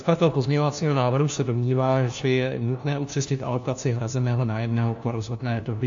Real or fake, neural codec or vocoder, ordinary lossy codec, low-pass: fake; codec, 16 kHz, 1 kbps, FunCodec, trained on LibriTTS, 50 frames a second; AAC, 64 kbps; 7.2 kHz